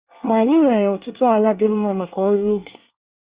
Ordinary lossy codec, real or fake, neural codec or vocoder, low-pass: Opus, 64 kbps; fake; codec, 24 kHz, 1 kbps, SNAC; 3.6 kHz